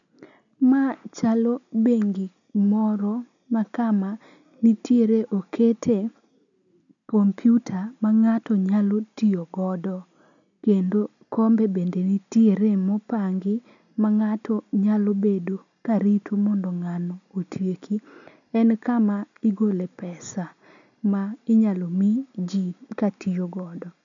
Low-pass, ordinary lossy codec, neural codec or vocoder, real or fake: 7.2 kHz; none; none; real